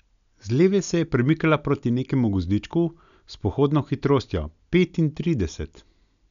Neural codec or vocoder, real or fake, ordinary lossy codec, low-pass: none; real; none; 7.2 kHz